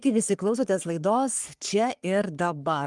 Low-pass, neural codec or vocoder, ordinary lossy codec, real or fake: 10.8 kHz; codec, 44.1 kHz, 3.4 kbps, Pupu-Codec; Opus, 32 kbps; fake